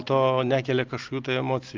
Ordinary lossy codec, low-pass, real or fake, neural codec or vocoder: Opus, 32 kbps; 7.2 kHz; real; none